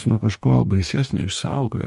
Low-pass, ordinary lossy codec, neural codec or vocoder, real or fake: 14.4 kHz; MP3, 48 kbps; codec, 44.1 kHz, 2.6 kbps, DAC; fake